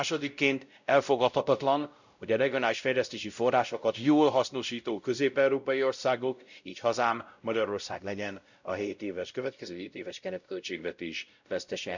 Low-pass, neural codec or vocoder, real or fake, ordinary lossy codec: 7.2 kHz; codec, 16 kHz, 0.5 kbps, X-Codec, WavLM features, trained on Multilingual LibriSpeech; fake; none